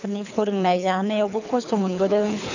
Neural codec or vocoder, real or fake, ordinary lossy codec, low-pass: codec, 24 kHz, 3 kbps, HILCodec; fake; none; 7.2 kHz